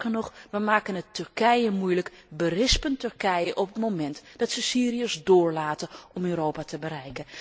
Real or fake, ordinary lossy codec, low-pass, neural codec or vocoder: real; none; none; none